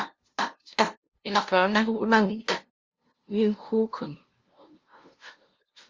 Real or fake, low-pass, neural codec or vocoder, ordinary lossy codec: fake; 7.2 kHz; codec, 16 kHz, 0.5 kbps, FunCodec, trained on LibriTTS, 25 frames a second; Opus, 32 kbps